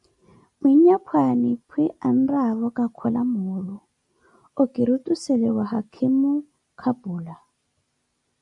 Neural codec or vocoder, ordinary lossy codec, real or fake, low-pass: none; AAC, 64 kbps; real; 10.8 kHz